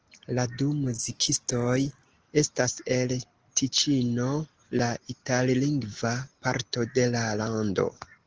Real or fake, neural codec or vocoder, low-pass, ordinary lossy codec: real; none; 7.2 kHz; Opus, 16 kbps